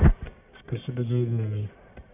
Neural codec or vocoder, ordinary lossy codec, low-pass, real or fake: codec, 44.1 kHz, 1.7 kbps, Pupu-Codec; none; 3.6 kHz; fake